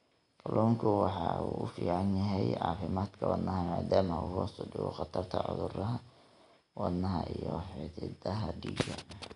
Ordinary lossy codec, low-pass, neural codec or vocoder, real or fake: none; 10.8 kHz; none; real